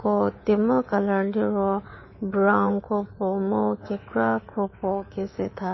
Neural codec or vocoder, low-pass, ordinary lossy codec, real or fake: codec, 24 kHz, 3.1 kbps, DualCodec; 7.2 kHz; MP3, 24 kbps; fake